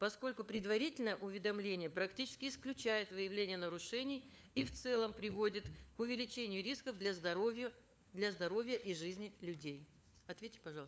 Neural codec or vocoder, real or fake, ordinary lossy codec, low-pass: codec, 16 kHz, 4 kbps, FunCodec, trained on LibriTTS, 50 frames a second; fake; none; none